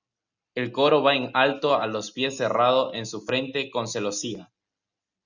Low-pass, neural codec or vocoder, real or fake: 7.2 kHz; none; real